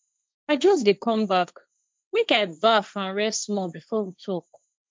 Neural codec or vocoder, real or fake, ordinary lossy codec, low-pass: codec, 16 kHz, 1.1 kbps, Voila-Tokenizer; fake; none; none